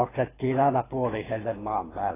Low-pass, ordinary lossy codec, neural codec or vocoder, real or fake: 3.6 kHz; AAC, 16 kbps; codec, 24 kHz, 6 kbps, HILCodec; fake